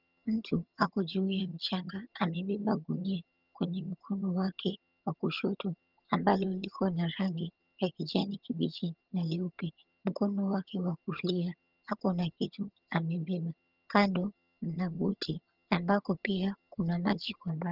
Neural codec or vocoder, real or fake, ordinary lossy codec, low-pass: vocoder, 22.05 kHz, 80 mel bands, HiFi-GAN; fake; Opus, 24 kbps; 5.4 kHz